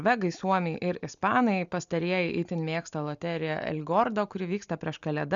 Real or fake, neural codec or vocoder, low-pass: real; none; 7.2 kHz